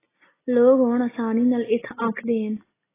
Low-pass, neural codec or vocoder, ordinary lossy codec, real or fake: 3.6 kHz; none; AAC, 16 kbps; real